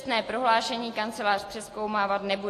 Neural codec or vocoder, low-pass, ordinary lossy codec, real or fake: none; 14.4 kHz; AAC, 48 kbps; real